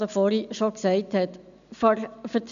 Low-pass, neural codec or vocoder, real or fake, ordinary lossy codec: 7.2 kHz; none; real; MP3, 96 kbps